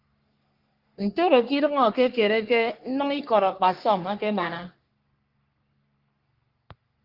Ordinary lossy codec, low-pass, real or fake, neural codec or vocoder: Opus, 32 kbps; 5.4 kHz; fake; codec, 44.1 kHz, 3.4 kbps, Pupu-Codec